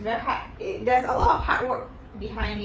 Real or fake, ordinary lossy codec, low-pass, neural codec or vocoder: fake; none; none; codec, 16 kHz, 4 kbps, FreqCodec, larger model